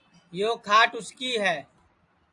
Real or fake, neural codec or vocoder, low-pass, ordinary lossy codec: real; none; 10.8 kHz; AAC, 64 kbps